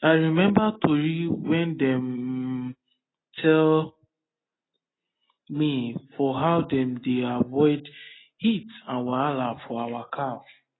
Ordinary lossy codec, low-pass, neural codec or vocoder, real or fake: AAC, 16 kbps; 7.2 kHz; none; real